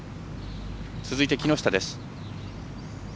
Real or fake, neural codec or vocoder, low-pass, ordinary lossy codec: real; none; none; none